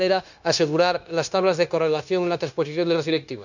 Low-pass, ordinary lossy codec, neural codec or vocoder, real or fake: 7.2 kHz; none; codec, 16 kHz, 0.9 kbps, LongCat-Audio-Codec; fake